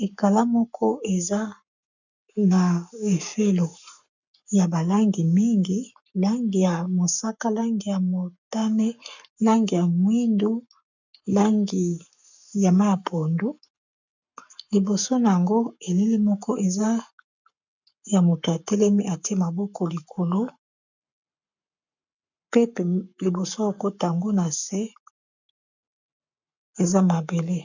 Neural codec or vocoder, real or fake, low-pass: codec, 44.1 kHz, 7.8 kbps, Pupu-Codec; fake; 7.2 kHz